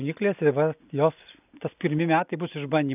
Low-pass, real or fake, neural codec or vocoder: 3.6 kHz; real; none